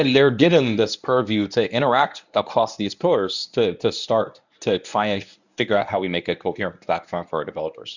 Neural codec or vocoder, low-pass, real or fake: codec, 24 kHz, 0.9 kbps, WavTokenizer, medium speech release version 1; 7.2 kHz; fake